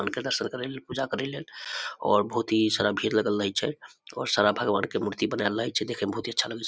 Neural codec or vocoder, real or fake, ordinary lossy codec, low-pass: none; real; none; none